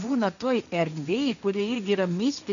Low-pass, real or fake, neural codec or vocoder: 7.2 kHz; fake; codec, 16 kHz, 1.1 kbps, Voila-Tokenizer